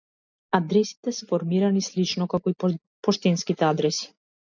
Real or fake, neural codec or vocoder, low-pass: real; none; 7.2 kHz